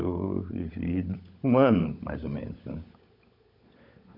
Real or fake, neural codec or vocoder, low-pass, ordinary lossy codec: fake; codec, 16 kHz, 16 kbps, FunCodec, trained on LibriTTS, 50 frames a second; 5.4 kHz; none